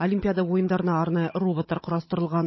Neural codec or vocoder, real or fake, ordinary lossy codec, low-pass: codec, 16 kHz, 16 kbps, FunCodec, trained on Chinese and English, 50 frames a second; fake; MP3, 24 kbps; 7.2 kHz